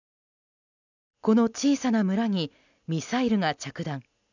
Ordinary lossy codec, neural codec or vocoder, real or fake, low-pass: none; none; real; 7.2 kHz